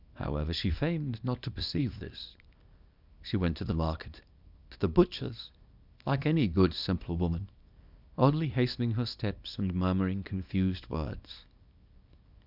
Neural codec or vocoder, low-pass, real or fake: codec, 24 kHz, 0.9 kbps, WavTokenizer, small release; 5.4 kHz; fake